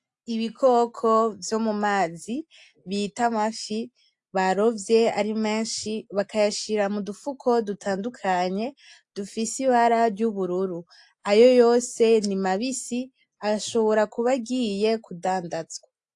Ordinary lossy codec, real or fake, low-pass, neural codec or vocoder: AAC, 64 kbps; real; 10.8 kHz; none